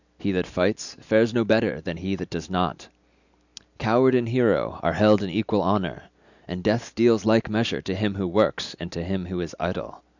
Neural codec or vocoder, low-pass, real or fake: none; 7.2 kHz; real